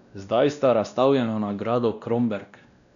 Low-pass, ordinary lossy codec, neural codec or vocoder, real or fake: 7.2 kHz; none; codec, 16 kHz, 1 kbps, X-Codec, WavLM features, trained on Multilingual LibriSpeech; fake